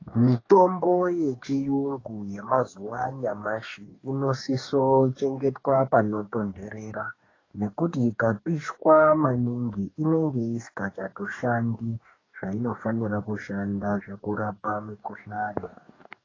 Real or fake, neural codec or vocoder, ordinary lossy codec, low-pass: fake; codec, 44.1 kHz, 2.6 kbps, DAC; AAC, 32 kbps; 7.2 kHz